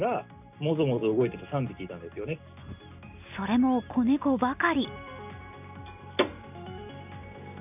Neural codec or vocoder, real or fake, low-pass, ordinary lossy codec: none; real; 3.6 kHz; none